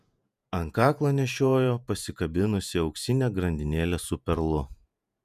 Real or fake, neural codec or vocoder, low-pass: fake; vocoder, 48 kHz, 128 mel bands, Vocos; 14.4 kHz